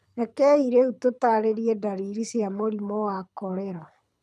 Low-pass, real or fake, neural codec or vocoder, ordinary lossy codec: none; fake; codec, 24 kHz, 6 kbps, HILCodec; none